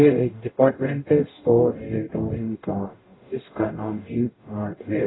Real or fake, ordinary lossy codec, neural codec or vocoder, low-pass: fake; AAC, 16 kbps; codec, 44.1 kHz, 0.9 kbps, DAC; 7.2 kHz